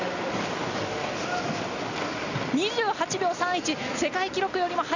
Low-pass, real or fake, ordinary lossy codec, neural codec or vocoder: 7.2 kHz; real; none; none